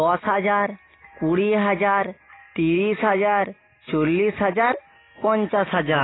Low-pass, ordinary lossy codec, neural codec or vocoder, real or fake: 7.2 kHz; AAC, 16 kbps; none; real